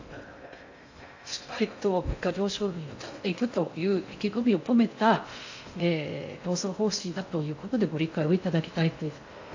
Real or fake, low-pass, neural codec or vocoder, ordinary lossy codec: fake; 7.2 kHz; codec, 16 kHz in and 24 kHz out, 0.6 kbps, FocalCodec, streaming, 2048 codes; AAC, 48 kbps